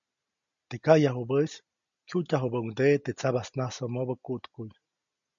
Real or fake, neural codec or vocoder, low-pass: real; none; 7.2 kHz